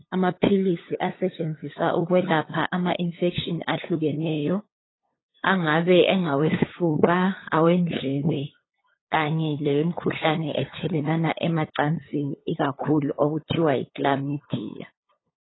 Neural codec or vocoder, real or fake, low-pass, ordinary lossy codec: codec, 16 kHz, 8 kbps, FunCodec, trained on LibriTTS, 25 frames a second; fake; 7.2 kHz; AAC, 16 kbps